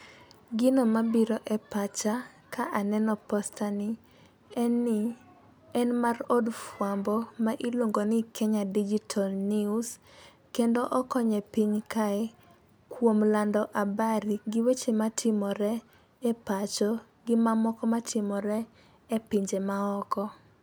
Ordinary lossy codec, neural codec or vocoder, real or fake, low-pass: none; none; real; none